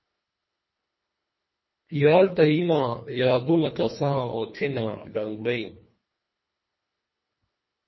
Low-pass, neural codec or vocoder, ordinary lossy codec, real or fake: 7.2 kHz; codec, 24 kHz, 1.5 kbps, HILCodec; MP3, 24 kbps; fake